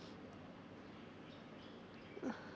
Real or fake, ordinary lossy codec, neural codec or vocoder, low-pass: real; none; none; none